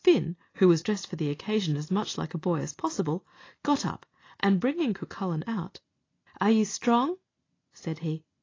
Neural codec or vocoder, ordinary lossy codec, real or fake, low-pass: none; AAC, 32 kbps; real; 7.2 kHz